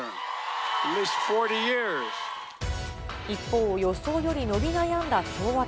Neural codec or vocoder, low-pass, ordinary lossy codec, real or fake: none; none; none; real